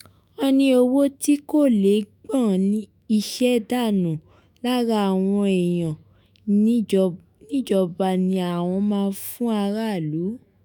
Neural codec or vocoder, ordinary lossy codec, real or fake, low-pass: autoencoder, 48 kHz, 128 numbers a frame, DAC-VAE, trained on Japanese speech; none; fake; none